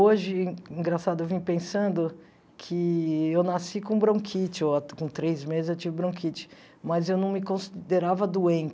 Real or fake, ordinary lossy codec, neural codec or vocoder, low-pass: real; none; none; none